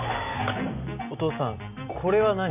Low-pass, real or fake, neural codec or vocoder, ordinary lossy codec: 3.6 kHz; real; none; none